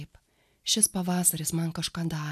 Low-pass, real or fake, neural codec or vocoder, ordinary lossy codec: 14.4 kHz; real; none; AAC, 96 kbps